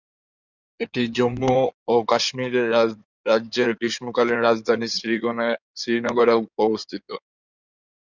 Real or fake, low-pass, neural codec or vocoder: fake; 7.2 kHz; codec, 16 kHz in and 24 kHz out, 2.2 kbps, FireRedTTS-2 codec